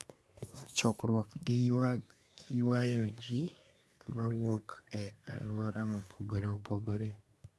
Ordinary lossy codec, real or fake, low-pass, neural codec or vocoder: none; fake; none; codec, 24 kHz, 1 kbps, SNAC